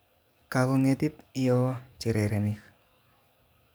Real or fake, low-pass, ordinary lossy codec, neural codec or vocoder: fake; none; none; codec, 44.1 kHz, 7.8 kbps, DAC